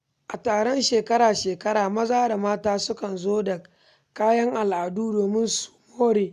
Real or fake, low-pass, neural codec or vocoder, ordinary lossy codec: real; 14.4 kHz; none; none